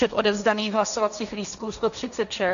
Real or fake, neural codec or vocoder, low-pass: fake; codec, 16 kHz, 1.1 kbps, Voila-Tokenizer; 7.2 kHz